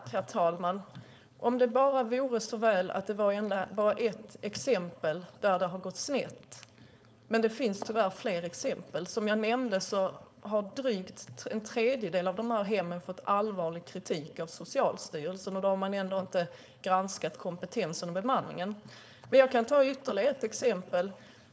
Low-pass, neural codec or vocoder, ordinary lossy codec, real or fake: none; codec, 16 kHz, 4.8 kbps, FACodec; none; fake